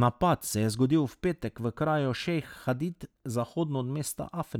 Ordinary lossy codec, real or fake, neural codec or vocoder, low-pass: none; real; none; 19.8 kHz